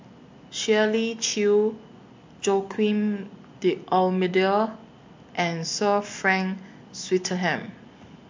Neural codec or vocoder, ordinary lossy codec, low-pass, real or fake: none; MP3, 48 kbps; 7.2 kHz; real